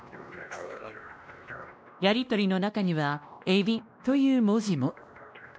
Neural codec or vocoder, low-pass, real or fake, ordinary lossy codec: codec, 16 kHz, 1 kbps, X-Codec, WavLM features, trained on Multilingual LibriSpeech; none; fake; none